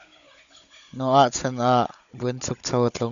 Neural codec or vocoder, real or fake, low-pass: codec, 16 kHz, 16 kbps, FunCodec, trained on Chinese and English, 50 frames a second; fake; 7.2 kHz